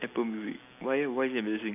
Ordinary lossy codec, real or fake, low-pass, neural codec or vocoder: none; real; 3.6 kHz; none